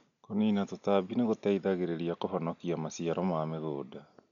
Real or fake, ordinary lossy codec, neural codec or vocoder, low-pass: real; none; none; 7.2 kHz